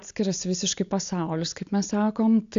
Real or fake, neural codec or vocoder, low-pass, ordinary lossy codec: real; none; 7.2 kHz; AAC, 64 kbps